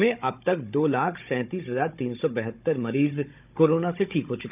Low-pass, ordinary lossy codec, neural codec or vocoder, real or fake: 3.6 kHz; none; codec, 16 kHz, 16 kbps, FunCodec, trained on Chinese and English, 50 frames a second; fake